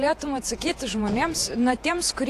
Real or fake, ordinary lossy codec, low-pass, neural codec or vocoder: fake; AAC, 64 kbps; 14.4 kHz; vocoder, 48 kHz, 128 mel bands, Vocos